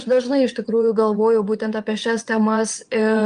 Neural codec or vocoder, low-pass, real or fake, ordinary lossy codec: vocoder, 22.05 kHz, 80 mel bands, WaveNeXt; 9.9 kHz; fake; Opus, 32 kbps